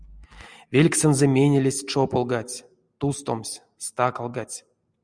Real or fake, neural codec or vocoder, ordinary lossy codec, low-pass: real; none; Opus, 64 kbps; 9.9 kHz